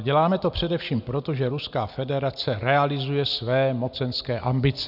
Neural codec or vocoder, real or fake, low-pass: none; real; 5.4 kHz